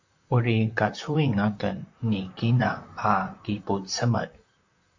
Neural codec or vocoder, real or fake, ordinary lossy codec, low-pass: vocoder, 44.1 kHz, 128 mel bands, Pupu-Vocoder; fake; AAC, 48 kbps; 7.2 kHz